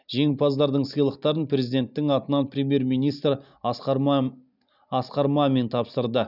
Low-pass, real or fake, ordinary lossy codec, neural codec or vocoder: 5.4 kHz; real; none; none